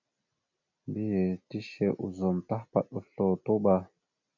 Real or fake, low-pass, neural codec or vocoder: real; 7.2 kHz; none